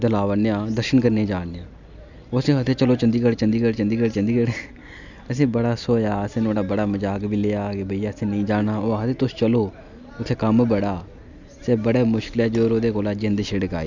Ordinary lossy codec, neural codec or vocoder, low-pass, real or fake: none; none; 7.2 kHz; real